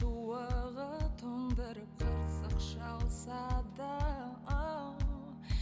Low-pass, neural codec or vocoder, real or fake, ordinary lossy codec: none; none; real; none